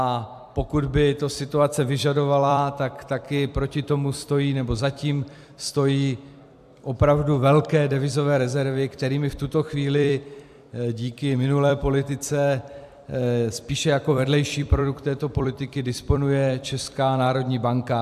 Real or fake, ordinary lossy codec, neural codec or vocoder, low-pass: fake; AAC, 96 kbps; vocoder, 44.1 kHz, 128 mel bands every 256 samples, BigVGAN v2; 14.4 kHz